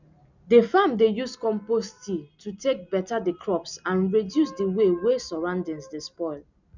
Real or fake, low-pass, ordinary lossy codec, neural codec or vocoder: real; 7.2 kHz; none; none